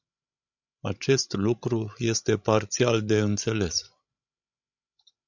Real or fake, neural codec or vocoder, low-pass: fake; codec, 16 kHz, 16 kbps, FreqCodec, larger model; 7.2 kHz